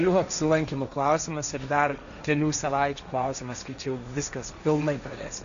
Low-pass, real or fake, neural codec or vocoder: 7.2 kHz; fake; codec, 16 kHz, 1.1 kbps, Voila-Tokenizer